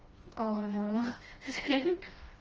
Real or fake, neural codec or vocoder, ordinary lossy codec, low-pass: fake; codec, 16 kHz, 1 kbps, FreqCodec, smaller model; Opus, 24 kbps; 7.2 kHz